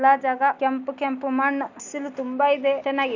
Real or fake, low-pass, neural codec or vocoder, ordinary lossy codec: real; 7.2 kHz; none; none